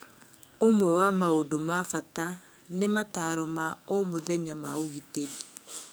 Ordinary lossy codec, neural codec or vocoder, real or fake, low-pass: none; codec, 44.1 kHz, 2.6 kbps, SNAC; fake; none